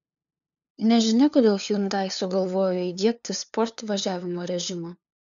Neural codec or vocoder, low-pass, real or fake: codec, 16 kHz, 2 kbps, FunCodec, trained on LibriTTS, 25 frames a second; 7.2 kHz; fake